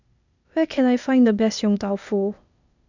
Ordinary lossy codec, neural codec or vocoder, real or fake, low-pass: none; codec, 16 kHz, 0.8 kbps, ZipCodec; fake; 7.2 kHz